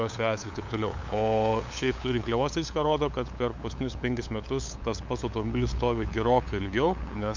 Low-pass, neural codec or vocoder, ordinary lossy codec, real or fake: 7.2 kHz; codec, 16 kHz, 8 kbps, FunCodec, trained on LibriTTS, 25 frames a second; MP3, 64 kbps; fake